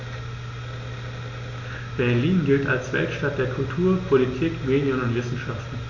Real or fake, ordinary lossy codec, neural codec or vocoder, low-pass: real; none; none; 7.2 kHz